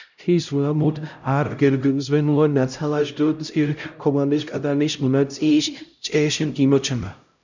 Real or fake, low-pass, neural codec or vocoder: fake; 7.2 kHz; codec, 16 kHz, 0.5 kbps, X-Codec, HuBERT features, trained on LibriSpeech